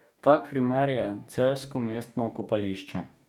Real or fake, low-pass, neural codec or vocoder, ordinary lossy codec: fake; 19.8 kHz; codec, 44.1 kHz, 2.6 kbps, DAC; none